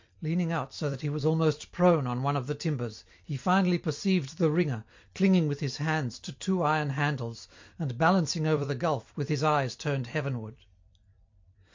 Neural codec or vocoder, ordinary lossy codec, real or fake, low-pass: vocoder, 44.1 kHz, 128 mel bands every 256 samples, BigVGAN v2; MP3, 48 kbps; fake; 7.2 kHz